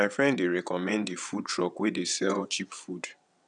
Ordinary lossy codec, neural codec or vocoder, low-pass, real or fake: none; vocoder, 22.05 kHz, 80 mel bands, WaveNeXt; 9.9 kHz; fake